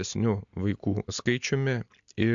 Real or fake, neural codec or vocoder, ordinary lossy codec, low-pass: real; none; MP3, 64 kbps; 7.2 kHz